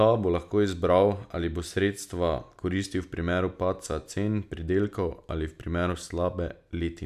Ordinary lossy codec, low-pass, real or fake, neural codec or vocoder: none; 14.4 kHz; real; none